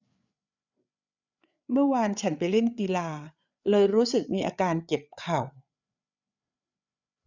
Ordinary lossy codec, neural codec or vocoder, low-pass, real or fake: none; codec, 16 kHz, 8 kbps, FreqCodec, larger model; 7.2 kHz; fake